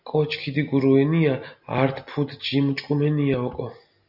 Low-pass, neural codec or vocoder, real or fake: 5.4 kHz; none; real